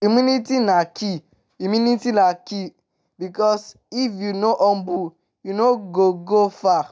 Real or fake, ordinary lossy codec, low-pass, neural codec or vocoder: real; none; none; none